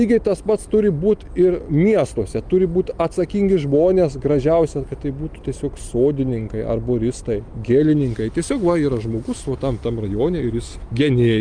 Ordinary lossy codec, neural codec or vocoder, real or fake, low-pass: Opus, 32 kbps; none; real; 9.9 kHz